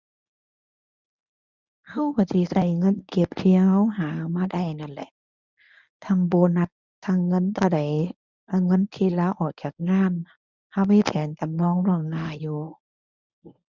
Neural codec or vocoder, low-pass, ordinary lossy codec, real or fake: codec, 24 kHz, 0.9 kbps, WavTokenizer, medium speech release version 1; 7.2 kHz; none; fake